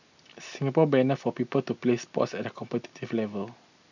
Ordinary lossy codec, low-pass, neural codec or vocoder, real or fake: none; 7.2 kHz; none; real